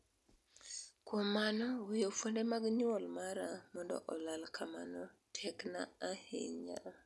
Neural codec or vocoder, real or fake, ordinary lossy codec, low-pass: none; real; none; none